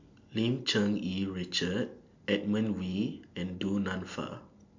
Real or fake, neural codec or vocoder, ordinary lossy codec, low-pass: real; none; MP3, 64 kbps; 7.2 kHz